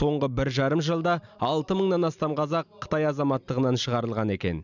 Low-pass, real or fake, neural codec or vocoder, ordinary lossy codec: 7.2 kHz; real; none; none